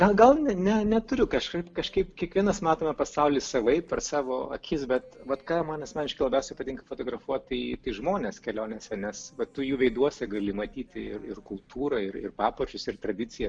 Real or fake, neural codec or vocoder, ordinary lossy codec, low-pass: real; none; MP3, 96 kbps; 9.9 kHz